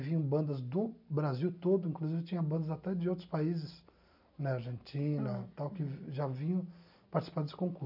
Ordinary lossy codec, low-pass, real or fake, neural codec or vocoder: none; 5.4 kHz; real; none